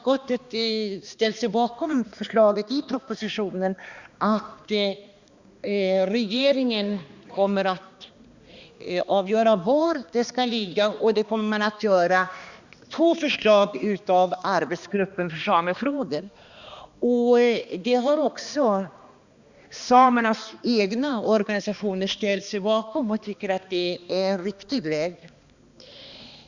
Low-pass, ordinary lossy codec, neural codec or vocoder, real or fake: 7.2 kHz; Opus, 64 kbps; codec, 16 kHz, 2 kbps, X-Codec, HuBERT features, trained on balanced general audio; fake